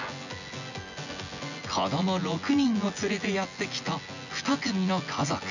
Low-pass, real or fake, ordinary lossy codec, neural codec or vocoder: 7.2 kHz; fake; none; vocoder, 24 kHz, 100 mel bands, Vocos